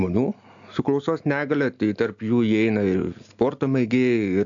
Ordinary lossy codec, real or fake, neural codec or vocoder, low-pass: AAC, 64 kbps; real; none; 7.2 kHz